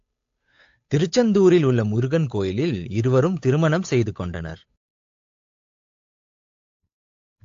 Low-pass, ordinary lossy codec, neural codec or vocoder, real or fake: 7.2 kHz; AAC, 48 kbps; codec, 16 kHz, 8 kbps, FunCodec, trained on Chinese and English, 25 frames a second; fake